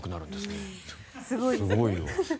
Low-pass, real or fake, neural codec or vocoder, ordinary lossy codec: none; real; none; none